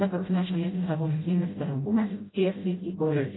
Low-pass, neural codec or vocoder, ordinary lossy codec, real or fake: 7.2 kHz; codec, 16 kHz, 0.5 kbps, FreqCodec, smaller model; AAC, 16 kbps; fake